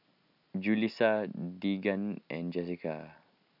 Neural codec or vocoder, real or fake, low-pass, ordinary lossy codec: none; real; 5.4 kHz; none